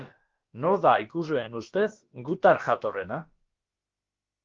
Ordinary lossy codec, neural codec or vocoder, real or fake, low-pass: Opus, 32 kbps; codec, 16 kHz, about 1 kbps, DyCAST, with the encoder's durations; fake; 7.2 kHz